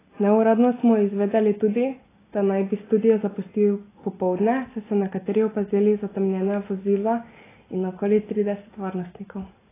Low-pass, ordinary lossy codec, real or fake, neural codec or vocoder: 3.6 kHz; AAC, 16 kbps; real; none